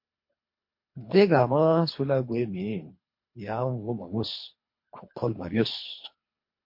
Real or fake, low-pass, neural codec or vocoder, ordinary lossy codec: fake; 5.4 kHz; codec, 24 kHz, 3 kbps, HILCodec; MP3, 32 kbps